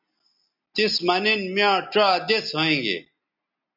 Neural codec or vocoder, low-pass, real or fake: none; 5.4 kHz; real